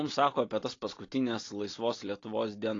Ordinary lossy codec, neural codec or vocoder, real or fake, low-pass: AAC, 32 kbps; none; real; 7.2 kHz